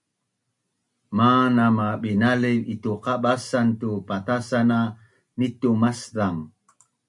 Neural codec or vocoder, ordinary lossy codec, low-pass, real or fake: none; MP3, 96 kbps; 10.8 kHz; real